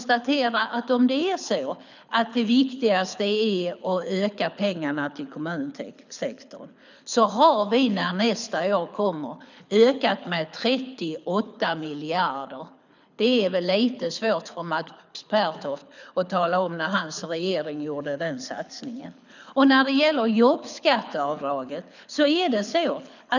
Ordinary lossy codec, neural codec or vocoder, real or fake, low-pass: none; codec, 24 kHz, 6 kbps, HILCodec; fake; 7.2 kHz